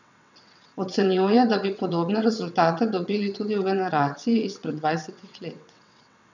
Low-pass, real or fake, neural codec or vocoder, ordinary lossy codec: 7.2 kHz; fake; vocoder, 22.05 kHz, 80 mel bands, WaveNeXt; none